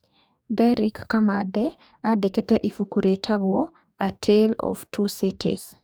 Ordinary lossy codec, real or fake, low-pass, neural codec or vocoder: none; fake; none; codec, 44.1 kHz, 2.6 kbps, DAC